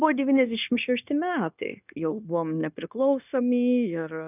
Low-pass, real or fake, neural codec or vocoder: 3.6 kHz; fake; codec, 16 kHz, 0.9 kbps, LongCat-Audio-Codec